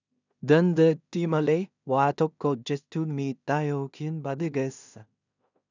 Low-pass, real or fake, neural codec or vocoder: 7.2 kHz; fake; codec, 16 kHz in and 24 kHz out, 0.4 kbps, LongCat-Audio-Codec, two codebook decoder